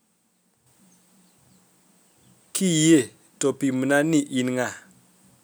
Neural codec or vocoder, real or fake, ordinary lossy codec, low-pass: none; real; none; none